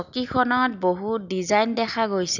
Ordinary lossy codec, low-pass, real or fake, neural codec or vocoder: none; 7.2 kHz; real; none